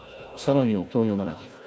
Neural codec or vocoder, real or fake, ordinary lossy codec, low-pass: codec, 16 kHz, 1 kbps, FunCodec, trained on Chinese and English, 50 frames a second; fake; none; none